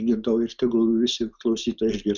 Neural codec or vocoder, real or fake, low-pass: none; real; 7.2 kHz